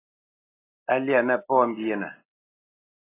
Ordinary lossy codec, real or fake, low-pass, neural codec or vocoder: AAC, 16 kbps; real; 3.6 kHz; none